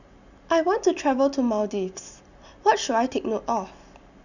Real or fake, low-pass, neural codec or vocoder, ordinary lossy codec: real; 7.2 kHz; none; none